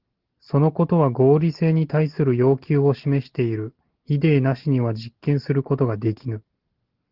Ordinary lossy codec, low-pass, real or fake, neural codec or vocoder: Opus, 16 kbps; 5.4 kHz; real; none